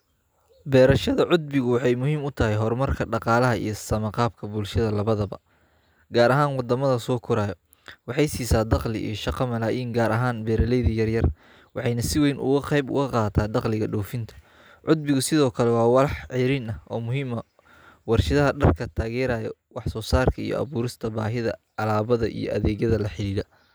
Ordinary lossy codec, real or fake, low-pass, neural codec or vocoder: none; real; none; none